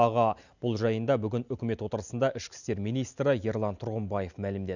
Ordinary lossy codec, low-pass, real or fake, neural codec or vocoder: none; 7.2 kHz; real; none